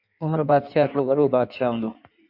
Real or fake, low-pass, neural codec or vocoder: fake; 5.4 kHz; codec, 16 kHz in and 24 kHz out, 1.1 kbps, FireRedTTS-2 codec